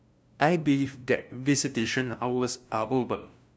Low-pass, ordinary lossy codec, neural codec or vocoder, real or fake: none; none; codec, 16 kHz, 0.5 kbps, FunCodec, trained on LibriTTS, 25 frames a second; fake